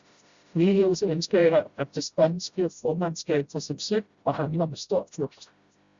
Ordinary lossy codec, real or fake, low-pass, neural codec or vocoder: Opus, 64 kbps; fake; 7.2 kHz; codec, 16 kHz, 0.5 kbps, FreqCodec, smaller model